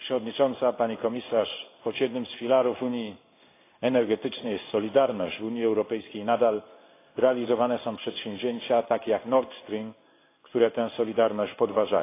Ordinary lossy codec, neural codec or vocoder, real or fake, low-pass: AAC, 24 kbps; codec, 16 kHz in and 24 kHz out, 1 kbps, XY-Tokenizer; fake; 3.6 kHz